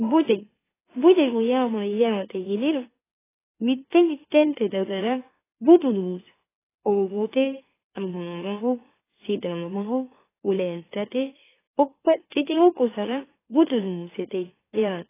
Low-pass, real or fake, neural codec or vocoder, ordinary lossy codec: 3.6 kHz; fake; autoencoder, 44.1 kHz, a latent of 192 numbers a frame, MeloTTS; AAC, 16 kbps